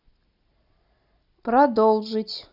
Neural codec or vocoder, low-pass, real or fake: none; 5.4 kHz; real